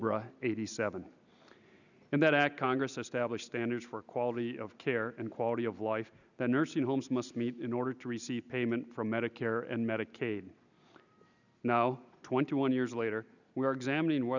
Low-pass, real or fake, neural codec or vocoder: 7.2 kHz; real; none